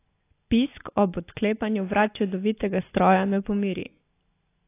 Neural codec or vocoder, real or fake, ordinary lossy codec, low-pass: none; real; AAC, 24 kbps; 3.6 kHz